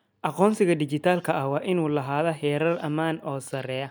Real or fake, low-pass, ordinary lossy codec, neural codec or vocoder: real; none; none; none